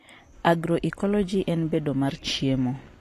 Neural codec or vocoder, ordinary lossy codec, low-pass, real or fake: none; AAC, 48 kbps; 14.4 kHz; real